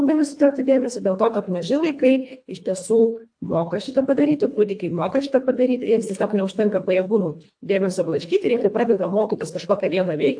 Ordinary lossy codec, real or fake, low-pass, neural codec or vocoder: AAC, 64 kbps; fake; 9.9 kHz; codec, 24 kHz, 1.5 kbps, HILCodec